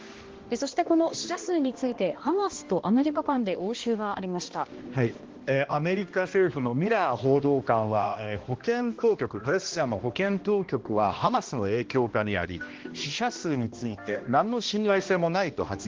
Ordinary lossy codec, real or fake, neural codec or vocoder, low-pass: Opus, 16 kbps; fake; codec, 16 kHz, 1 kbps, X-Codec, HuBERT features, trained on balanced general audio; 7.2 kHz